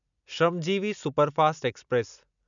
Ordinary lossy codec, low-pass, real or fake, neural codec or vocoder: none; 7.2 kHz; real; none